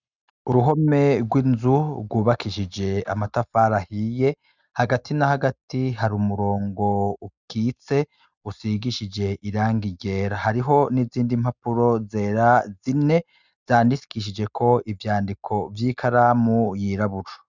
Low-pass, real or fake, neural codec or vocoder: 7.2 kHz; real; none